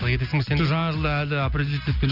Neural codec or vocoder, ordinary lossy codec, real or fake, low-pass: none; none; real; 5.4 kHz